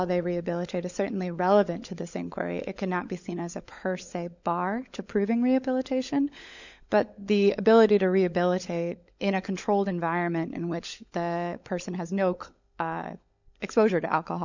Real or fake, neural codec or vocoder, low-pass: fake; codec, 16 kHz, 16 kbps, FunCodec, trained on LibriTTS, 50 frames a second; 7.2 kHz